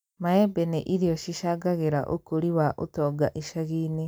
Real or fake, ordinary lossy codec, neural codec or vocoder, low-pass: real; none; none; none